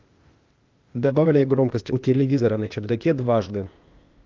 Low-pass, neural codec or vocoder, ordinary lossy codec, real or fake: 7.2 kHz; codec, 16 kHz, 0.8 kbps, ZipCodec; Opus, 24 kbps; fake